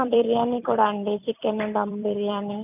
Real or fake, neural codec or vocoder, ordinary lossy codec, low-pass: fake; vocoder, 44.1 kHz, 128 mel bands every 256 samples, BigVGAN v2; none; 3.6 kHz